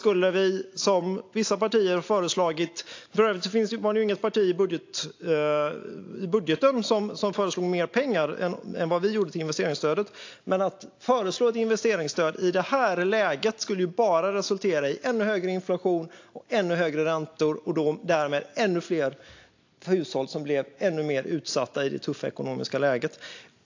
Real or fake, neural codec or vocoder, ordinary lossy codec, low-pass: real; none; AAC, 48 kbps; 7.2 kHz